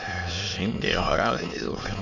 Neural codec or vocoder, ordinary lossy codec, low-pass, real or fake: autoencoder, 22.05 kHz, a latent of 192 numbers a frame, VITS, trained on many speakers; MP3, 48 kbps; 7.2 kHz; fake